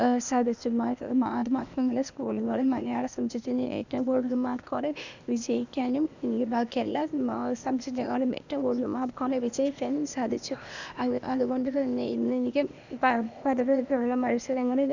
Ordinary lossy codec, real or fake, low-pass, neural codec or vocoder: none; fake; 7.2 kHz; codec, 16 kHz, 0.8 kbps, ZipCodec